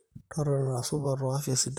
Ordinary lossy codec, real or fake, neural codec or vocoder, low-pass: none; fake; vocoder, 44.1 kHz, 128 mel bands, Pupu-Vocoder; none